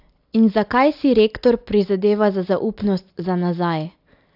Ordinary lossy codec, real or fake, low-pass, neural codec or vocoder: AAC, 48 kbps; real; 5.4 kHz; none